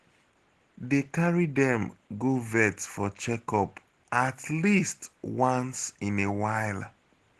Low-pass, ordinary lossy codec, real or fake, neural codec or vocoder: 10.8 kHz; Opus, 16 kbps; real; none